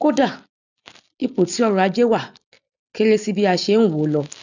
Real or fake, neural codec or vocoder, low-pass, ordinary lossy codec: real; none; 7.2 kHz; none